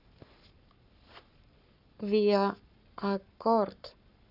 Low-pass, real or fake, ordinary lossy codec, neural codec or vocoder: 5.4 kHz; fake; none; codec, 44.1 kHz, 7.8 kbps, Pupu-Codec